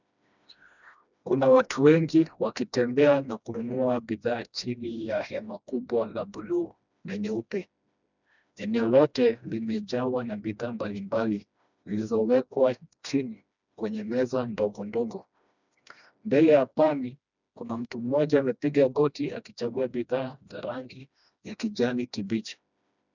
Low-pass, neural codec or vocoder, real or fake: 7.2 kHz; codec, 16 kHz, 1 kbps, FreqCodec, smaller model; fake